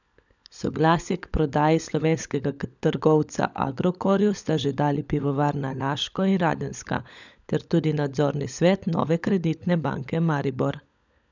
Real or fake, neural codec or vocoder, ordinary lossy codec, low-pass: fake; codec, 16 kHz, 16 kbps, FunCodec, trained on LibriTTS, 50 frames a second; none; 7.2 kHz